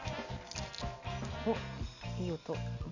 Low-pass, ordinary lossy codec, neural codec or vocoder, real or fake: 7.2 kHz; none; none; real